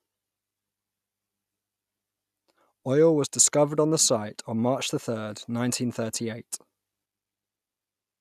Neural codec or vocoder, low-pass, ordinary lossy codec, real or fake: none; 14.4 kHz; none; real